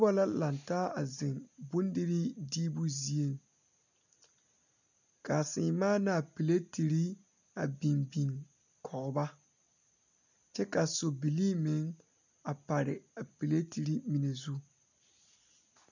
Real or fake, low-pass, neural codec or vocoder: real; 7.2 kHz; none